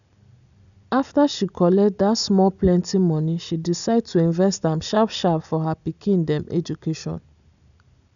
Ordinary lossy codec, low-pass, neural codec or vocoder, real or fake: none; 7.2 kHz; none; real